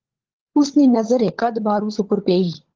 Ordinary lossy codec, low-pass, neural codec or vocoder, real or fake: Opus, 16 kbps; 7.2 kHz; codec, 16 kHz, 16 kbps, FunCodec, trained on LibriTTS, 50 frames a second; fake